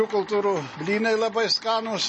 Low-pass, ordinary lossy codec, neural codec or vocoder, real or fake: 10.8 kHz; MP3, 32 kbps; none; real